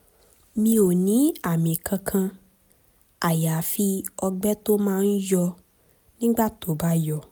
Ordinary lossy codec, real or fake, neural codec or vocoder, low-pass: none; real; none; none